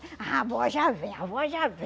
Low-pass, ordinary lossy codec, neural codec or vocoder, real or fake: none; none; none; real